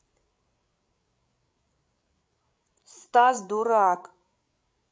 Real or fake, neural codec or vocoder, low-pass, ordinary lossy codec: fake; codec, 16 kHz, 16 kbps, FreqCodec, larger model; none; none